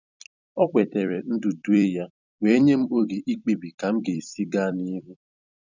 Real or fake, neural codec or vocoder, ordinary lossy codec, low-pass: fake; vocoder, 44.1 kHz, 128 mel bands every 256 samples, BigVGAN v2; none; 7.2 kHz